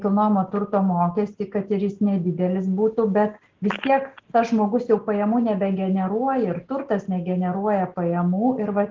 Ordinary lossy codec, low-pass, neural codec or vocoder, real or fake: Opus, 16 kbps; 7.2 kHz; none; real